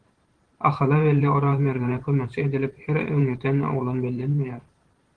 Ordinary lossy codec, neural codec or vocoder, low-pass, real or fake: Opus, 16 kbps; vocoder, 44.1 kHz, 128 mel bands every 512 samples, BigVGAN v2; 9.9 kHz; fake